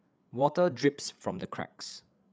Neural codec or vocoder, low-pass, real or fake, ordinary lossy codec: codec, 16 kHz, 8 kbps, FreqCodec, larger model; none; fake; none